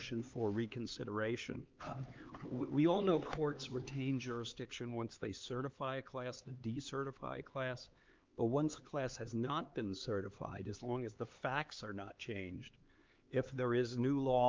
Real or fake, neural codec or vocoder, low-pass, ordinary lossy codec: fake; codec, 16 kHz, 4 kbps, X-Codec, HuBERT features, trained on LibriSpeech; 7.2 kHz; Opus, 32 kbps